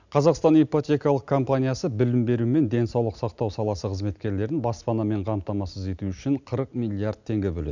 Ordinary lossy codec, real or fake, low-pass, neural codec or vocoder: none; real; 7.2 kHz; none